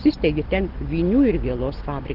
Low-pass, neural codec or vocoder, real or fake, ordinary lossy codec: 5.4 kHz; none; real; Opus, 16 kbps